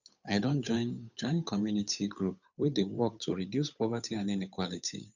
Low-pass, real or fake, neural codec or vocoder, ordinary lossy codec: 7.2 kHz; fake; codec, 16 kHz, 8 kbps, FunCodec, trained on Chinese and English, 25 frames a second; none